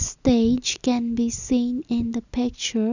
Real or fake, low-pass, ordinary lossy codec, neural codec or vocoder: real; 7.2 kHz; none; none